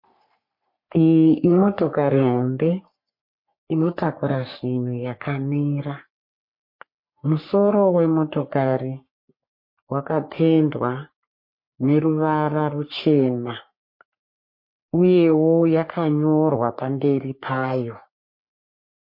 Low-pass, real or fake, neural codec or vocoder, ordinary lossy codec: 5.4 kHz; fake; codec, 44.1 kHz, 3.4 kbps, Pupu-Codec; MP3, 32 kbps